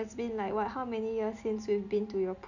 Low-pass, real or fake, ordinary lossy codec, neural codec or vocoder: 7.2 kHz; real; none; none